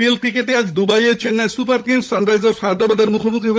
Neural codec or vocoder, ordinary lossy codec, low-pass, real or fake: codec, 16 kHz, 16 kbps, FunCodec, trained on LibriTTS, 50 frames a second; none; none; fake